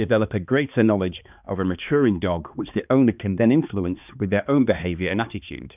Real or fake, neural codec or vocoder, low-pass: fake; codec, 16 kHz, 2 kbps, X-Codec, HuBERT features, trained on balanced general audio; 3.6 kHz